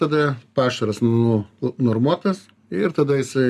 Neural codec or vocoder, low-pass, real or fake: none; 14.4 kHz; real